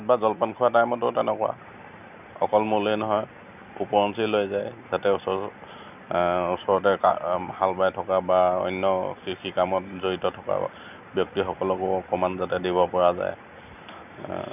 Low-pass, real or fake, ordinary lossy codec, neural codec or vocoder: 3.6 kHz; real; none; none